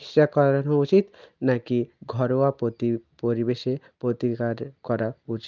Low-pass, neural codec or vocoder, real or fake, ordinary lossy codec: 7.2 kHz; none; real; Opus, 24 kbps